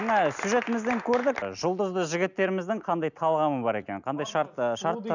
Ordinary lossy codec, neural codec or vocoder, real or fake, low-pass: none; none; real; 7.2 kHz